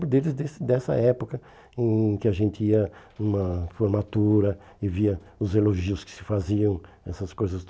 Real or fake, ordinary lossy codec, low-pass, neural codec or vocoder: real; none; none; none